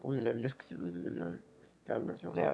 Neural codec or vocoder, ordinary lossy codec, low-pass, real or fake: autoencoder, 22.05 kHz, a latent of 192 numbers a frame, VITS, trained on one speaker; none; none; fake